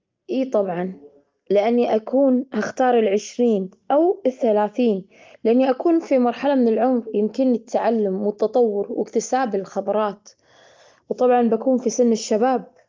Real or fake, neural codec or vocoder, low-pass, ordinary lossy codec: real; none; 7.2 kHz; Opus, 24 kbps